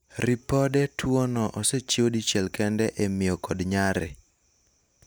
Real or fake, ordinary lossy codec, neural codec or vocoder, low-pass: real; none; none; none